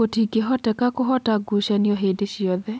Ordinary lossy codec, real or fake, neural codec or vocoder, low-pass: none; real; none; none